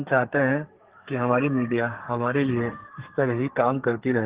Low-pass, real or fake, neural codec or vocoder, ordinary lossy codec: 3.6 kHz; fake; codec, 32 kHz, 1.9 kbps, SNAC; Opus, 16 kbps